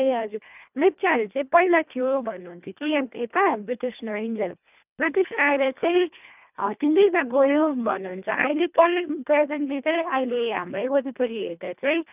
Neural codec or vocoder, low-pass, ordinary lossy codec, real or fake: codec, 24 kHz, 1.5 kbps, HILCodec; 3.6 kHz; none; fake